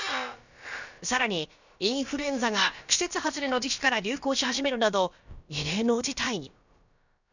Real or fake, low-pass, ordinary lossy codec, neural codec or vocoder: fake; 7.2 kHz; none; codec, 16 kHz, about 1 kbps, DyCAST, with the encoder's durations